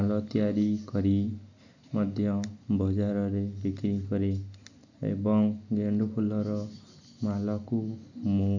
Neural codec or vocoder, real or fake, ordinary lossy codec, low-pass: none; real; none; 7.2 kHz